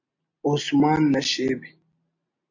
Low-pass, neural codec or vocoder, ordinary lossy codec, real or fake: 7.2 kHz; none; AAC, 48 kbps; real